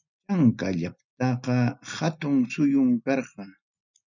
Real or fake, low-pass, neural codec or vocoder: real; 7.2 kHz; none